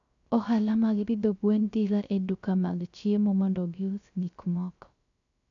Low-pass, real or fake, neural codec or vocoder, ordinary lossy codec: 7.2 kHz; fake; codec, 16 kHz, 0.3 kbps, FocalCodec; none